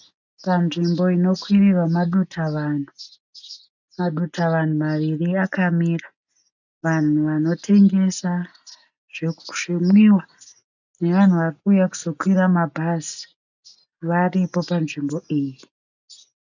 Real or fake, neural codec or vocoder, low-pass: real; none; 7.2 kHz